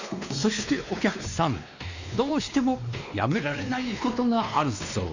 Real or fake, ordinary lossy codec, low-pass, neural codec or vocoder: fake; Opus, 64 kbps; 7.2 kHz; codec, 16 kHz, 2 kbps, X-Codec, WavLM features, trained on Multilingual LibriSpeech